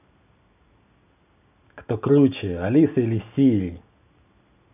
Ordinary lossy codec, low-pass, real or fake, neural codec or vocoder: none; 3.6 kHz; real; none